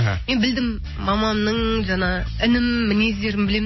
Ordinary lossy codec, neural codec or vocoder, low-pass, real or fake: MP3, 24 kbps; none; 7.2 kHz; real